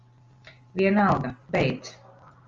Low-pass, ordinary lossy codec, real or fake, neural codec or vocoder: 7.2 kHz; Opus, 32 kbps; real; none